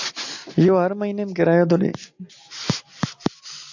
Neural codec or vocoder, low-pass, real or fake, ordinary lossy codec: none; 7.2 kHz; real; AAC, 48 kbps